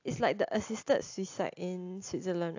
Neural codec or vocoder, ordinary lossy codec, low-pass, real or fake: none; AAC, 48 kbps; 7.2 kHz; real